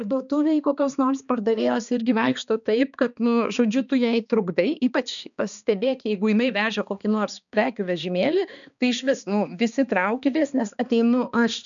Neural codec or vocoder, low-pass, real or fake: codec, 16 kHz, 2 kbps, X-Codec, HuBERT features, trained on balanced general audio; 7.2 kHz; fake